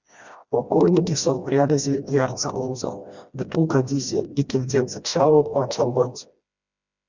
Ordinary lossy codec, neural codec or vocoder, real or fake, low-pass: Opus, 64 kbps; codec, 16 kHz, 1 kbps, FreqCodec, smaller model; fake; 7.2 kHz